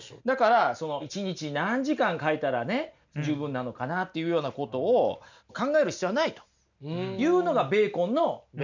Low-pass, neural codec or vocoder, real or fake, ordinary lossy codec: 7.2 kHz; none; real; none